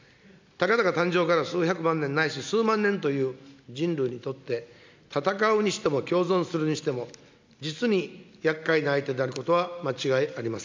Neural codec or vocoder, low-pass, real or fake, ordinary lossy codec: none; 7.2 kHz; real; MP3, 64 kbps